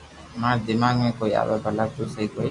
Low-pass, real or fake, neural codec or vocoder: 10.8 kHz; real; none